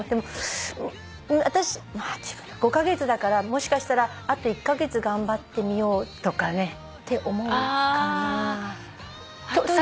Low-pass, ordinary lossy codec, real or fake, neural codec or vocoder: none; none; real; none